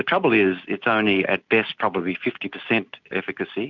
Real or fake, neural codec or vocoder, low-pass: real; none; 7.2 kHz